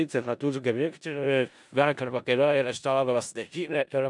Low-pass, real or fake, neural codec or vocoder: 10.8 kHz; fake; codec, 16 kHz in and 24 kHz out, 0.4 kbps, LongCat-Audio-Codec, four codebook decoder